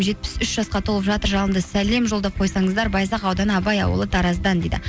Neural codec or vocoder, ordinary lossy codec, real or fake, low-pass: none; none; real; none